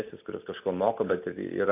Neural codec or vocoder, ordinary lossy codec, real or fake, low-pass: none; AAC, 24 kbps; real; 3.6 kHz